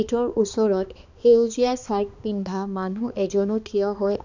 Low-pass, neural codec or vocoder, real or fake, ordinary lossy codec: 7.2 kHz; codec, 16 kHz, 2 kbps, X-Codec, HuBERT features, trained on balanced general audio; fake; none